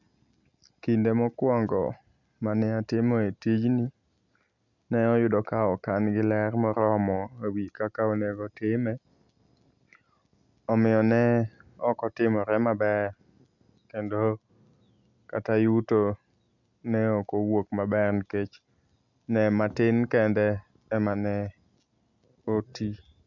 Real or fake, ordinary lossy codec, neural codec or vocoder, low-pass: real; none; none; 7.2 kHz